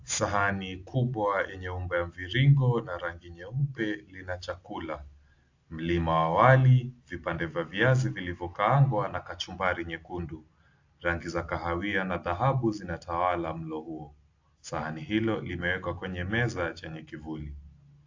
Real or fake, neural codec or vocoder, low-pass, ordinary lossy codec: real; none; 7.2 kHz; AAC, 48 kbps